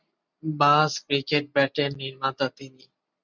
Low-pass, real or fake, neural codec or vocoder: 7.2 kHz; real; none